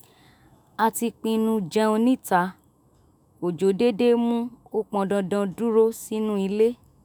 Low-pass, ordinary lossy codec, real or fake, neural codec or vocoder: none; none; fake; autoencoder, 48 kHz, 128 numbers a frame, DAC-VAE, trained on Japanese speech